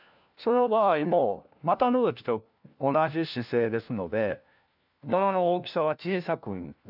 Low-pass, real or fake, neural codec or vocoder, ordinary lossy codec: 5.4 kHz; fake; codec, 16 kHz, 1 kbps, FunCodec, trained on LibriTTS, 50 frames a second; none